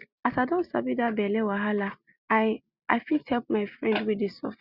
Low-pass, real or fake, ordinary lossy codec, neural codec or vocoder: 5.4 kHz; real; Opus, 64 kbps; none